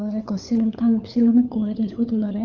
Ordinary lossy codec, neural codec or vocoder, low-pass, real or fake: Opus, 32 kbps; codec, 16 kHz, 4 kbps, FunCodec, trained on LibriTTS, 50 frames a second; 7.2 kHz; fake